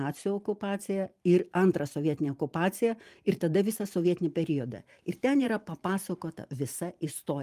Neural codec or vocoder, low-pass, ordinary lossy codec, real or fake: vocoder, 44.1 kHz, 128 mel bands every 512 samples, BigVGAN v2; 14.4 kHz; Opus, 32 kbps; fake